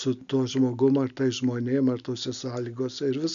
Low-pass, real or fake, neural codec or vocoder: 7.2 kHz; real; none